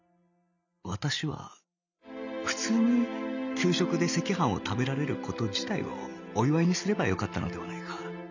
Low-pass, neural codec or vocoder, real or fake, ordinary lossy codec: 7.2 kHz; none; real; none